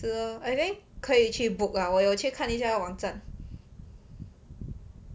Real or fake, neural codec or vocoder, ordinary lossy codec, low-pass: real; none; none; none